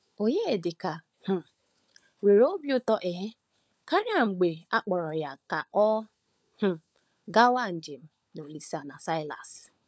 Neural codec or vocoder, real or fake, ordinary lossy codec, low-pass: codec, 16 kHz, 16 kbps, FunCodec, trained on LibriTTS, 50 frames a second; fake; none; none